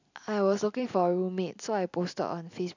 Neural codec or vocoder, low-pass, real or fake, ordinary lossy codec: none; 7.2 kHz; real; none